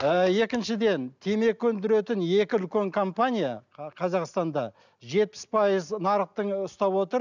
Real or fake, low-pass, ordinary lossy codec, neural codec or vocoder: real; 7.2 kHz; none; none